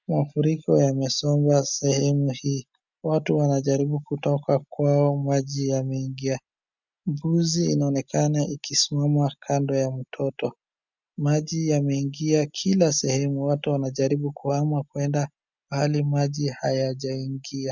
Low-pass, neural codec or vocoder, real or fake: 7.2 kHz; none; real